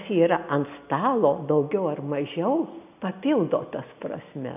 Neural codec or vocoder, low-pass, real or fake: none; 3.6 kHz; real